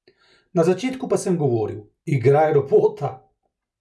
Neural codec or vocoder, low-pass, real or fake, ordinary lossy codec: none; none; real; none